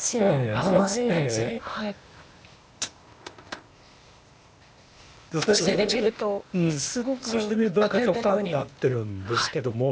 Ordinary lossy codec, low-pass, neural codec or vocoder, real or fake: none; none; codec, 16 kHz, 0.8 kbps, ZipCodec; fake